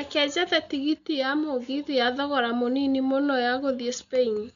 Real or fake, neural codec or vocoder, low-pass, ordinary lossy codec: real; none; 7.2 kHz; none